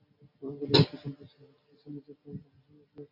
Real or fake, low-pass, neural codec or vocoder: real; 5.4 kHz; none